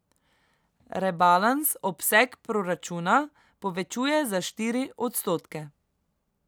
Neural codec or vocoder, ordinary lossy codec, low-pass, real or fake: none; none; none; real